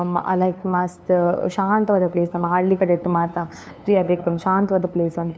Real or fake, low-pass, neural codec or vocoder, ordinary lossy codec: fake; none; codec, 16 kHz, 2 kbps, FunCodec, trained on LibriTTS, 25 frames a second; none